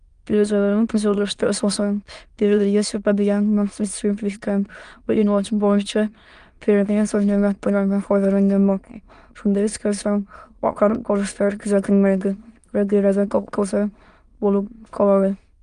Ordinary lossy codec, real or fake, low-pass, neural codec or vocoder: Opus, 32 kbps; fake; 9.9 kHz; autoencoder, 22.05 kHz, a latent of 192 numbers a frame, VITS, trained on many speakers